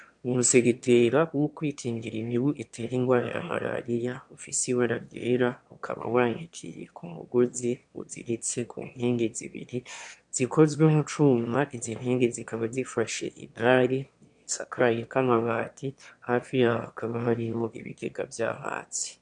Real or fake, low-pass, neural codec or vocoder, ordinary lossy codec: fake; 9.9 kHz; autoencoder, 22.05 kHz, a latent of 192 numbers a frame, VITS, trained on one speaker; MP3, 64 kbps